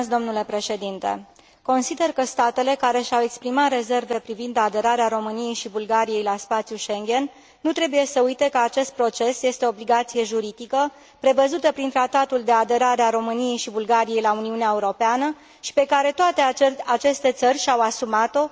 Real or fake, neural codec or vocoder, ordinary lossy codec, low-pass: real; none; none; none